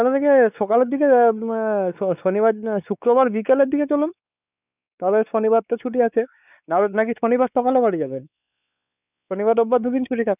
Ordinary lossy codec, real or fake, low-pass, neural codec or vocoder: none; fake; 3.6 kHz; codec, 16 kHz, 4 kbps, X-Codec, WavLM features, trained on Multilingual LibriSpeech